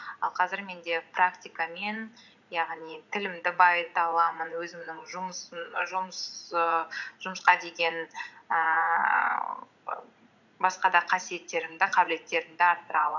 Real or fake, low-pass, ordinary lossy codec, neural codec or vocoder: fake; 7.2 kHz; none; vocoder, 44.1 kHz, 80 mel bands, Vocos